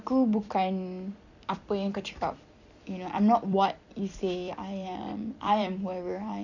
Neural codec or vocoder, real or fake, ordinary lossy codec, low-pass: vocoder, 44.1 kHz, 128 mel bands every 256 samples, BigVGAN v2; fake; none; 7.2 kHz